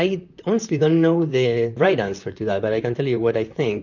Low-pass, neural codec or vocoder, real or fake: 7.2 kHz; vocoder, 44.1 kHz, 128 mel bands, Pupu-Vocoder; fake